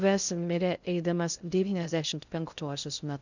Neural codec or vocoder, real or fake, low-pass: codec, 16 kHz in and 24 kHz out, 0.6 kbps, FocalCodec, streaming, 2048 codes; fake; 7.2 kHz